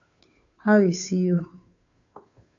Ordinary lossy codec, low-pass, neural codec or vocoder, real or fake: AAC, 64 kbps; 7.2 kHz; codec, 16 kHz, 2 kbps, FunCodec, trained on Chinese and English, 25 frames a second; fake